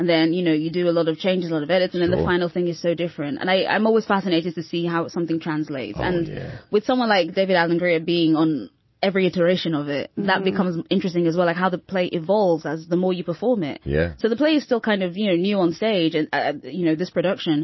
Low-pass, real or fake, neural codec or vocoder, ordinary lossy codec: 7.2 kHz; real; none; MP3, 24 kbps